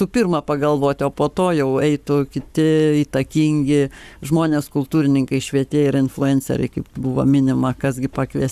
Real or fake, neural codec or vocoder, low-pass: fake; codec, 44.1 kHz, 7.8 kbps, Pupu-Codec; 14.4 kHz